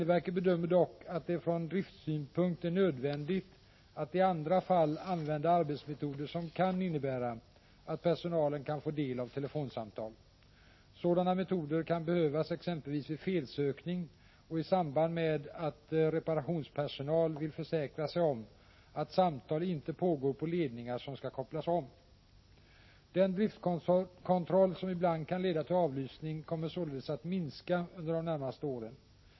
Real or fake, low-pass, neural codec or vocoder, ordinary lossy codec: real; 7.2 kHz; none; MP3, 24 kbps